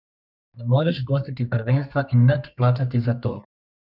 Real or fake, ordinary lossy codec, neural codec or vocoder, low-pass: fake; none; codec, 32 kHz, 1.9 kbps, SNAC; 5.4 kHz